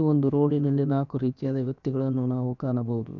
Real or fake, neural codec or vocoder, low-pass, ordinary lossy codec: fake; codec, 16 kHz, about 1 kbps, DyCAST, with the encoder's durations; 7.2 kHz; none